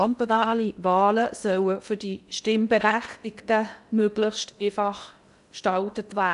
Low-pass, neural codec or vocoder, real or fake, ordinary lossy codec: 10.8 kHz; codec, 16 kHz in and 24 kHz out, 0.6 kbps, FocalCodec, streaming, 2048 codes; fake; none